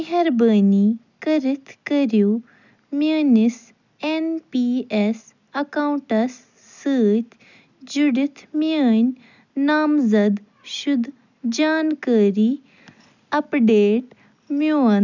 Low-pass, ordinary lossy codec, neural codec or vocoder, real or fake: 7.2 kHz; none; none; real